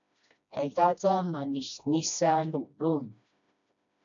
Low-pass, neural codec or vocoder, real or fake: 7.2 kHz; codec, 16 kHz, 1 kbps, FreqCodec, smaller model; fake